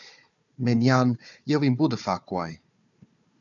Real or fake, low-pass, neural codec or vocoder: fake; 7.2 kHz; codec, 16 kHz, 4 kbps, FunCodec, trained on Chinese and English, 50 frames a second